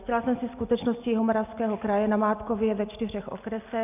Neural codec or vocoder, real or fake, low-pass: none; real; 3.6 kHz